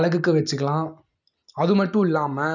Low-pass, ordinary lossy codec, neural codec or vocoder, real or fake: 7.2 kHz; none; none; real